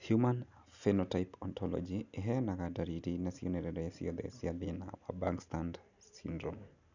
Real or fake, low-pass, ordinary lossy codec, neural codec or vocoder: real; 7.2 kHz; none; none